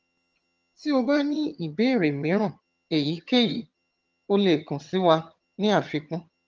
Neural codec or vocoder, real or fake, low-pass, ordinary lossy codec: vocoder, 22.05 kHz, 80 mel bands, HiFi-GAN; fake; 7.2 kHz; Opus, 24 kbps